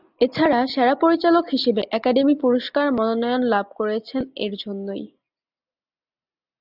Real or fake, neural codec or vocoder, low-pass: real; none; 5.4 kHz